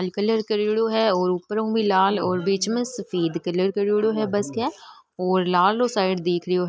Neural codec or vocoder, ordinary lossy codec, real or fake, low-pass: none; none; real; none